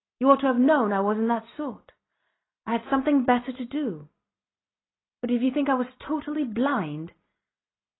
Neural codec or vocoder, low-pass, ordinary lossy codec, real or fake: none; 7.2 kHz; AAC, 16 kbps; real